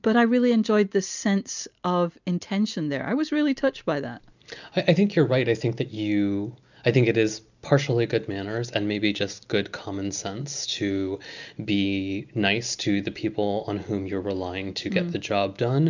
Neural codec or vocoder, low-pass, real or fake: none; 7.2 kHz; real